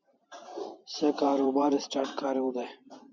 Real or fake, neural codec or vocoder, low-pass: fake; vocoder, 44.1 kHz, 128 mel bands every 512 samples, BigVGAN v2; 7.2 kHz